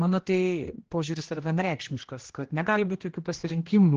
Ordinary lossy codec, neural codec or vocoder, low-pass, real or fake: Opus, 16 kbps; codec, 16 kHz, 1 kbps, X-Codec, HuBERT features, trained on general audio; 7.2 kHz; fake